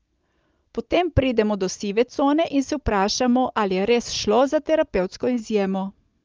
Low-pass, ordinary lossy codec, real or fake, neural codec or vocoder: 7.2 kHz; Opus, 24 kbps; real; none